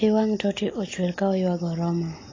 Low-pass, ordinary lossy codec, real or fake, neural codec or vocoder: 7.2 kHz; AAC, 32 kbps; real; none